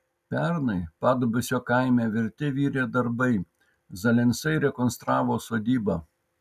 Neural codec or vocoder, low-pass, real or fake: none; 14.4 kHz; real